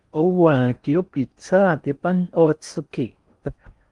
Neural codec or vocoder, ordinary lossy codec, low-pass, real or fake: codec, 16 kHz in and 24 kHz out, 0.6 kbps, FocalCodec, streaming, 4096 codes; Opus, 32 kbps; 10.8 kHz; fake